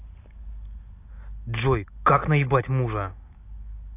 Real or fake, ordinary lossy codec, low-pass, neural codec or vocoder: real; AAC, 24 kbps; 3.6 kHz; none